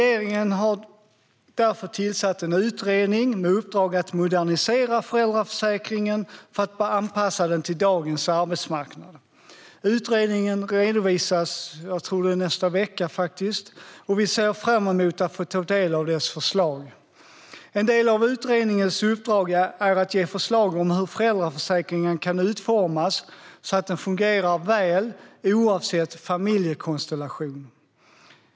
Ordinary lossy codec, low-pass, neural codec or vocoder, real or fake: none; none; none; real